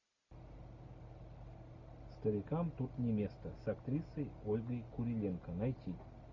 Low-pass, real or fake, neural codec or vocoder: 7.2 kHz; real; none